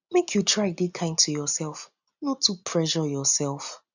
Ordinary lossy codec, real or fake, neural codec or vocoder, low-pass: none; real; none; 7.2 kHz